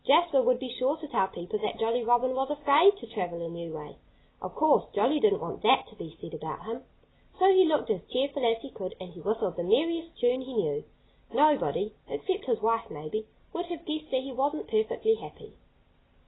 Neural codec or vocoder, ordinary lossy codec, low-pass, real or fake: none; AAC, 16 kbps; 7.2 kHz; real